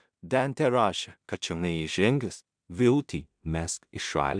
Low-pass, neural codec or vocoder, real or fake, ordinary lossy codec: 9.9 kHz; codec, 16 kHz in and 24 kHz out, 0.4 kbps, LongCat-Audio-Codec, two codebook decoder; fake; MP3, 96 kbps